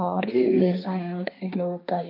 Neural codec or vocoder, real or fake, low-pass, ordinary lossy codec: codec, 16 kHz, 1.1 kbps, Voila-Tokenizer; fake; 5.4 kHz; MP3, 32 kbps